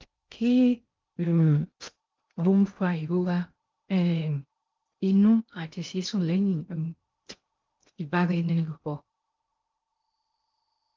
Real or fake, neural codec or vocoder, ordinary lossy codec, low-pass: fake; codec, 16 kHz in and 24 kHz out, 0.6 kbps, FocalCodec, streaming, 2048 codes; Opus, 32 kbps; 7.2 kHz